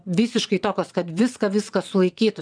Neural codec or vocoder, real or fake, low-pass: vocoder, 22.05 kHz, 80 mel bands, WaveNeXt; fake; 9.9 kHz